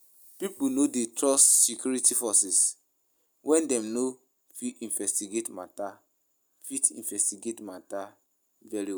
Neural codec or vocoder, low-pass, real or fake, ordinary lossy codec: none; none; real; none